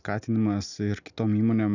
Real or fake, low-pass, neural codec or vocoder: real; 7.2 kHz; none